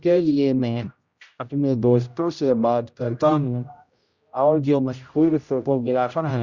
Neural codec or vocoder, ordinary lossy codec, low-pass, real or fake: codec, 16 kHz, 0.5 kbps, X-Codec, HuBERT features, trained on general audio; Opus, 64 kbps; 7.2 kHz; fake